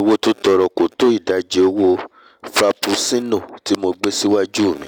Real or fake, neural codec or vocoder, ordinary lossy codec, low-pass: real; none; none; 19.8 kHz